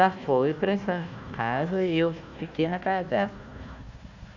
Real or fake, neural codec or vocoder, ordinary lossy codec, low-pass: fake; codec, 16 kHz, 1 kbps, FunCodec, trained on Chinese and English, 50 frames a second; none; 7.2 kHz